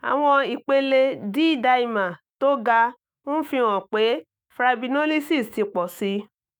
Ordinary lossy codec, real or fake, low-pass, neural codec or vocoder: none; fake; none; autoencoder, 48 kHz, 128 numbers a frame, DAC-VAE, trained on Japanese speech